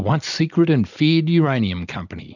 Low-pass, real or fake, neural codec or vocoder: 7.2 kHz; fake; vocoder, 44.1 kHz, 128 mel bands every 256 samples, BigVGAN v2